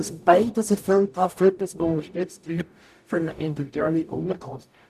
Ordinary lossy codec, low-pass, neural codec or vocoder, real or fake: none; 14.4 kHz; codec, 44.1 kHz, 0.9 kbps, DAC; fake